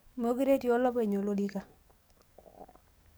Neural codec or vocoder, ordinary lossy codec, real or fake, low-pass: codec, 44.1 kHz, 7.8 kbps, DAC; none; fake; none